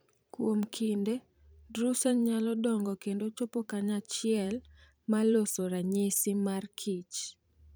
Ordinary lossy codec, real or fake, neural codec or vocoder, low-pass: none; fake; vocoder, 44.1 kHz, 128 mel bands every 512 samples, BigVGAN v2; none